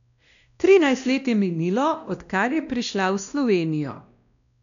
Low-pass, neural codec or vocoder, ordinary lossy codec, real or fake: 7.2 kHz; codec, 16 kHz, 1 kbps, X-Codec, WavLM features, trained on Multilingual LibriSpeech; none; fake